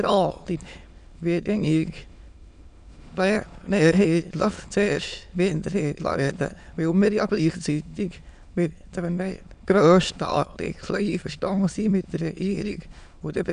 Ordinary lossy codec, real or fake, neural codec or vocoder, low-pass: none; fake; autoencoder, 22.05 kHz, a latent of 192 numbers a frame, VITS, trained on many speakers; 9.9 kHz